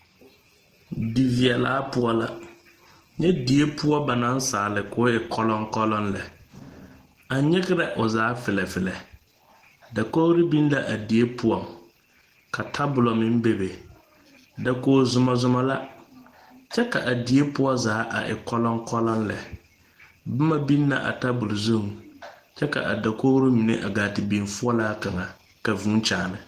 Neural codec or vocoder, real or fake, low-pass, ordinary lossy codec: none; real; 14.4 kHz; Opus, 16 kbps